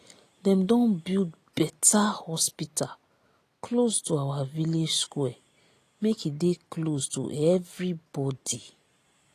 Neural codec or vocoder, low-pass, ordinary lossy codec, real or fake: none; 14.4 kHz; AAC, 48 kbps; real